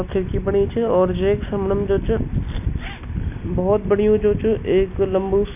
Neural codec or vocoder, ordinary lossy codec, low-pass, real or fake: none; none; 3.6 kHz; real